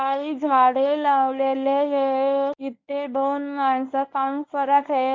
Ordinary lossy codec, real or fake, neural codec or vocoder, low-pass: MP3, 64 kbps; fake; codec, 24 kHz, 0.9 kbps, WavTokenizer, medium speech release version 1; 7.2 kHz